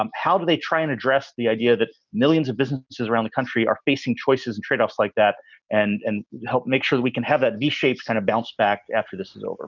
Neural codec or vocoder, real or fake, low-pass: none; real; 7.2 kHz